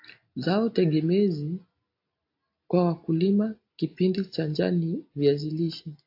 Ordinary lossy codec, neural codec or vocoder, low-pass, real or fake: AAC, 32 kbps; none; 5.4 kHz; real